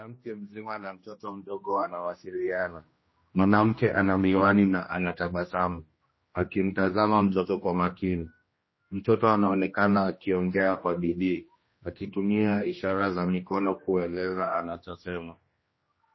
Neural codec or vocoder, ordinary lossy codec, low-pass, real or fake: codec, 16 kHz, 1 kbps, X-Codec, HuBERT features, trained on general audio; MP3, 24 kbps; 7.2 kHz; fake